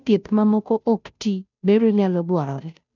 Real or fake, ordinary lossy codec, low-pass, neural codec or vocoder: fake; none; 7.2 kHz; codec, 16 kHz, 0.5 kbps, FunCodec, trained on Chinese and English, 25 frames a second